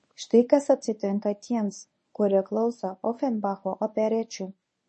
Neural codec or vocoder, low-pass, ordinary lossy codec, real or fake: codec, 24 kHz, 0.9 kbps, WavTokenizer, medium speech release version 1; 10.8 kHz; MP3, 32 kbps; fake